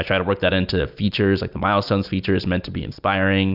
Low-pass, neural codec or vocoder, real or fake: 5.4 kHz; none; real